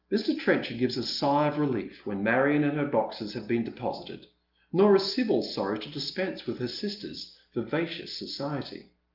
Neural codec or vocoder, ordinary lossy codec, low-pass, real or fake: none; Opus, 32 kbps; 5.4 kHz; real